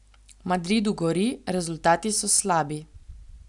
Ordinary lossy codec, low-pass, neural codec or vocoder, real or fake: none; 10.8 kHz; none; real